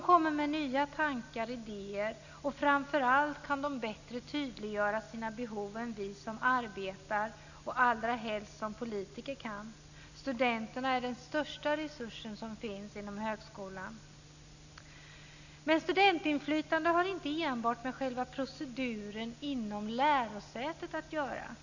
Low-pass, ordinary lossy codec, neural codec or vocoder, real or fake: 7.2 kHz; none; none; real